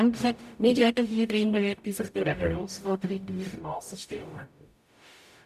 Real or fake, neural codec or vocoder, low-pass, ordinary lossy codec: fake; codec, 44.1 kHz, 0.9 kbps, DAC; 14.4 kHz; none